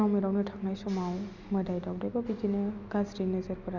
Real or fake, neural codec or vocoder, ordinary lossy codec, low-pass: real; none; none; 7.2 kHz